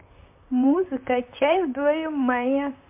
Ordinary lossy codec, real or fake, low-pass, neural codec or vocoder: MP3, 32 kbps; fake; 3.6 kHz; vocoder, 44.1 kHz, 128 mel bands, Pupu-Vocoder